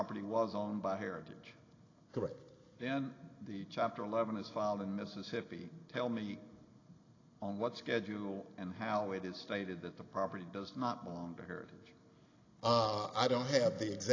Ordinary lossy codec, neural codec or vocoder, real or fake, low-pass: AAC, 32 kbps; none; real; 7.2 kHz